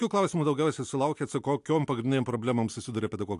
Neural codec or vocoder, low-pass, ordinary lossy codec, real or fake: none; 10.8 kHz; AAC, 64 kbps; real